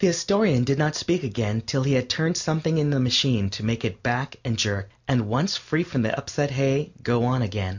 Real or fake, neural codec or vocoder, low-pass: real; none; 7.2 kHz